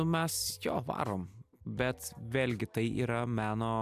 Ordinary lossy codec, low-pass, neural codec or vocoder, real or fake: MP3, 96 kbps; 14.4 kHz; none; real